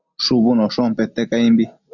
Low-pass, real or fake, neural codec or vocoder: 7.2 kHz; real; none